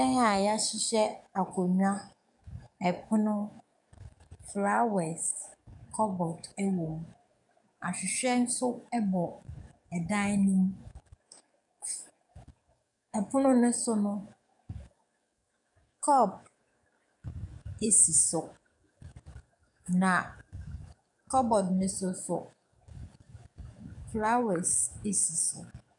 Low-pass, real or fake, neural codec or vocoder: 10.8 kHz; fake; codec, 44.1 kHz, 7.8 kbps, DAC